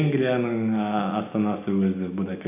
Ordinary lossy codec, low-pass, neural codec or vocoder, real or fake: none; 3.6 kHz; none; real